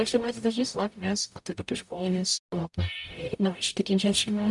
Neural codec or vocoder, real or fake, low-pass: codec, 44.1 kHz, 0.9 kbps, DAC; fake; 10.8 kHz